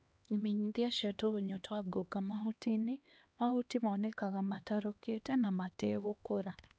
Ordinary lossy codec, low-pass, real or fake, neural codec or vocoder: none; none; fake; codec, 16 kHz, 2 kbps, X-Codec, HuBERT features, trained on LibriSpeech